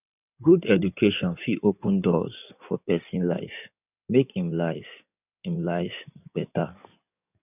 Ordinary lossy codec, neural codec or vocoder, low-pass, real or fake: none; codec, 16 kHz in and 24 kHz out, 2.2 kbps, FireRedTTS-2 codec; 3.6 kHz; fake